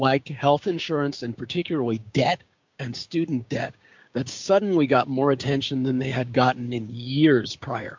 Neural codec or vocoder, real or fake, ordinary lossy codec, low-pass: codec, 16 kHz, 6 kbps, DAC; fake; MP3, 64 kbps; 7.2 kHz